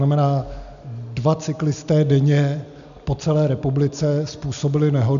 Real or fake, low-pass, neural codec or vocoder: real; 7.2 kHz; none